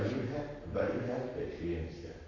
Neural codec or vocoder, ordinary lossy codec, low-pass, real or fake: codec, 44.1 kHz, 7.8 kbps, Pupu-Codec; AAC, 32 kbps; 7.2 kHz; fake